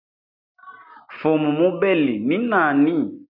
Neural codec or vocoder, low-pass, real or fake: none; 5.4 kHz; real